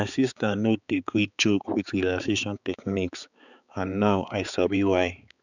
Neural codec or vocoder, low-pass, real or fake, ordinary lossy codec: codec, 16 kHz, 4 kbps, X-Codec, HuBERT features, trained on balanced general audio; 7.2 kHz; fake; none